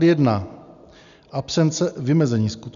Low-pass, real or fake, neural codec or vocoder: 7.2 kHz; real; none